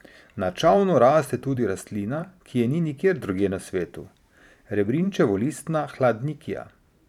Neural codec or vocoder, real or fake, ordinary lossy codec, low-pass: vocoder, 44.1 kHz, 128 mel bands every 512 samples, BigVGAN v2; fake; none; 19.8 kHz